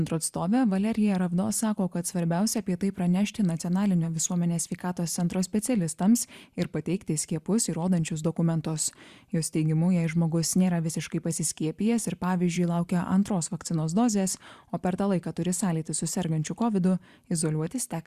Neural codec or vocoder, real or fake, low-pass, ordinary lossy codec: vocoder, 44.1 kHz, 128 mel bands every 512 samples, BigVGAN v2; fake; 14.4 kHz; Opus, 64 kbps